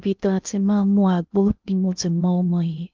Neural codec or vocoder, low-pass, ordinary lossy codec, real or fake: codec, 16 kHz in and 24 kHz out, 0.6 kbps, FocalCodec, streaming, 2048 codes; 7.2 kHz; Opus, 24 kbps; fake